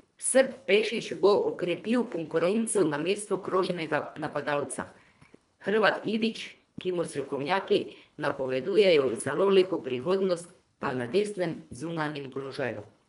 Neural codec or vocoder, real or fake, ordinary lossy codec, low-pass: codec, 24 kHz, 1.5 kbps, HILCodec; fake; none; 10.8 kHz